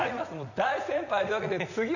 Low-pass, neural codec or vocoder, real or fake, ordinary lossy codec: 7.2 kHz; vocoder, 44.1 kHz, 80 mel bands, Vocos; fake; none